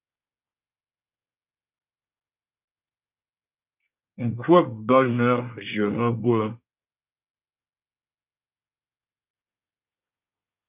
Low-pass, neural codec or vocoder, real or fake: 3.6 kHz; codec, 24 kHz, 1 kbps, SNAC; fake